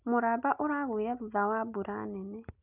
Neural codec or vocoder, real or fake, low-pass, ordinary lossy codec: none; real; 3.6 kHz; none